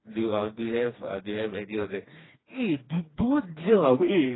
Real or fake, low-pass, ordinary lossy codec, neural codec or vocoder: fake; 7.2 kHz; AAC, 16 kbps; codec, 16 kHz, 2 kbps, FreqCodec, smaller model